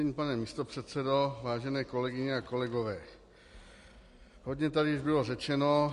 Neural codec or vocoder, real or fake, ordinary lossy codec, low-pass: none; real; MP3, 48 kbps; 14.4 kHz